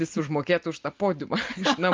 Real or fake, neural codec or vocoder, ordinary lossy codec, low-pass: real; none; Opus, 32 kbps; 7.2 kHz